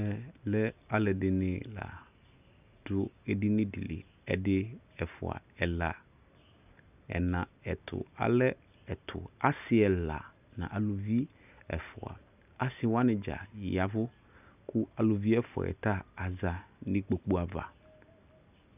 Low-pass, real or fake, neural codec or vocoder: 3.6 kHz; real; none